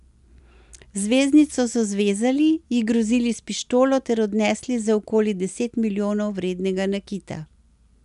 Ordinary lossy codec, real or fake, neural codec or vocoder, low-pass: none; real; none; 10.8 kHz